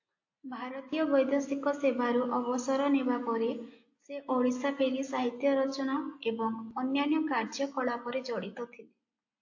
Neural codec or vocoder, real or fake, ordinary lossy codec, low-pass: none; real; AAC, 48 kbps; 7.2 kHz